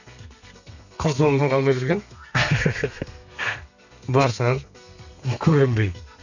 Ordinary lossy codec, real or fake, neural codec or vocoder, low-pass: none; fake; codec, 32 kHz, 1.9 kbps, SNAC; 7.2 kHz